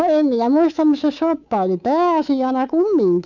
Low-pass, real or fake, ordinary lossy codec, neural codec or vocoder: 7.2 kHz; fake; none; codec, 24 kHz, 3.1 kbps, DualCodec